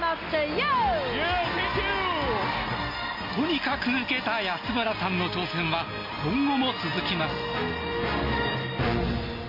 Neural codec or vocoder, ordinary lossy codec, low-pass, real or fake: none; MP3, 32 kbps; 5.4 kHz; real